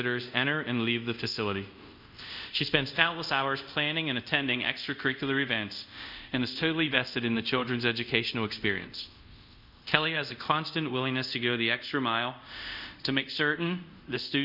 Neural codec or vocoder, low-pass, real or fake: codec, 24 kHz, 0.5 kbps, DualCodec; 5.4 kHz; fake